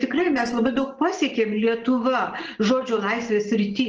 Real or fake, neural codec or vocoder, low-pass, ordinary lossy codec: real; none; 7.2 kHz; Opus, 16 kbps